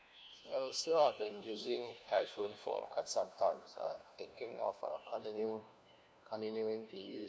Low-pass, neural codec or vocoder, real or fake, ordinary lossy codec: none; codec, 16 kHz, 1 kbps, FunCodec, trained on LibriTTS, 50 frames a second; fake; none